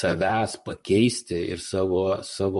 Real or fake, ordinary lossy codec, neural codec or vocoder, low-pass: fake; MP3, 48 kbps; vocoder, 44.1 kHz, 128 mel bands, Pupu-Vocoder; 14.4 kHz